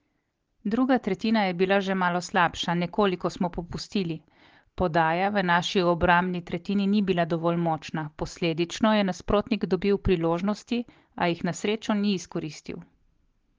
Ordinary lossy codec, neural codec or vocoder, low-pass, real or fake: Opus, 16 kbps; none; 7.2 kHz; real